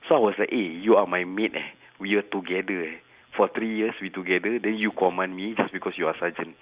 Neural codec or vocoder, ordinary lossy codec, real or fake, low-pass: none; Opus, 64 kbps; real; 3.6 kHz